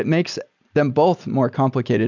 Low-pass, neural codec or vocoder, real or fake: 7.2 kHz; none; real